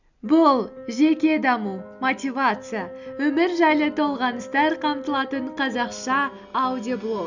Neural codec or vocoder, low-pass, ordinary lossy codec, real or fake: none; 7.2 kHz; none; real